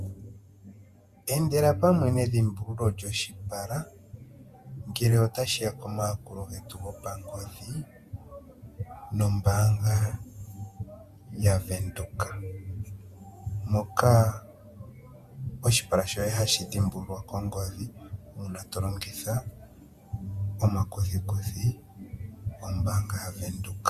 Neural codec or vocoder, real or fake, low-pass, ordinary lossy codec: vocoder, 48 kHz, 128 mel bands, Vocos; fake; 14.4 kHz; Opus, 64 kbps